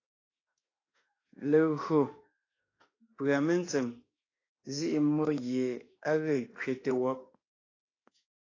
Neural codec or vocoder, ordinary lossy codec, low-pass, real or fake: autoencoder, 48 kHz, 32 numbers a frame, DAC-VAE, trained on Japanese speech; AAC, 32 kbps; 7.2 kHz; fake